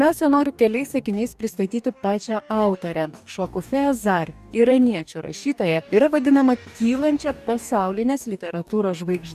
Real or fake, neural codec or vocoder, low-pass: fake; codec, 44.1 kHz, 2.6 kbps, DAC; 14.4 kHz